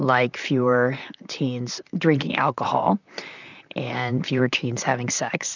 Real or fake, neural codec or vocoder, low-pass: fake; vocoder, 44.1 kHz, 128 mel bands, Pupu-Vocoder; 7.2 kHz